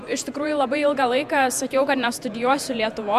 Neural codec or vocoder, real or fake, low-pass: vocoder, 48 kHz, 128 mel bands, Vocos; fake; 14.4 kHz